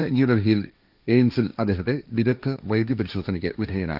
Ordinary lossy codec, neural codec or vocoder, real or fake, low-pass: none; codec, 16 kHz, 1.1 kbps, Voila-Tokenizer; fake; 5.4 kHz